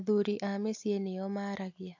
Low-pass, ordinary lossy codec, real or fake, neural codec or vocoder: 7.2 kHz; AAC, 48 kbps; real; none